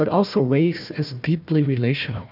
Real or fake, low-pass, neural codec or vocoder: fake; 5.4 kHz; codec, 16 kHz, 1 kbps, FunCodec, trained on Chinese and English, 50 frames a second